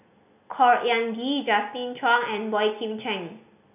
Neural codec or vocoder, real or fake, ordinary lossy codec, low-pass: none; real; none; 3.6 kHz